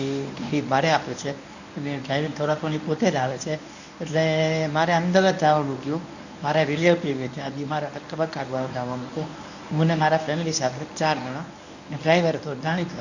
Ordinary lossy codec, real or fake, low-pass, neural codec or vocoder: none; fake; 7.2 kHz; codec, 24 kHz, 0.9 kbps, WavTokenizer, medium speech release version 1